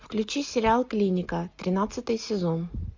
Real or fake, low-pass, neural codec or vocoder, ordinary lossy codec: real; 7.2 kHz; none; MP3, 48 kbps